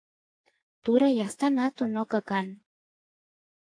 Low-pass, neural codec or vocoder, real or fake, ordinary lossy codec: 9.9 kHz; codec, 44.1 kHz, 2.6 kbps, SNAC; fake; AAC, 48 kbps